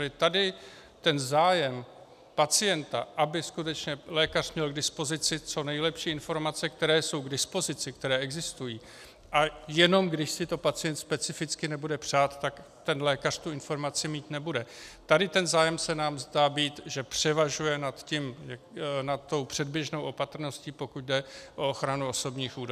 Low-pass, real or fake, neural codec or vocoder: 14.4 kHz; real; none